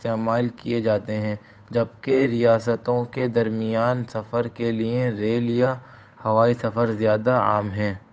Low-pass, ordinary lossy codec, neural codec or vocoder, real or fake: none; none; codec, 16 kHz, 8 kbps, FunCodec, trained on Chinese and English, 25 frames a second; fake